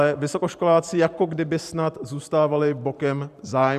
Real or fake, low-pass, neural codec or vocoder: fake; 14.4 kHz; vocoder, 44.1 kHz, 128 mel bands every 512 samples, BigVGAN v2